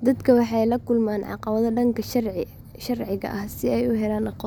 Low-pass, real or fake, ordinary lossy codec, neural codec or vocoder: 19.8 kHz; real; none; none